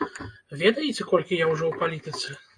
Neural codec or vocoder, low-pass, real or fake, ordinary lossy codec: none; 9.9 kHz; real; AAC, 48 kbps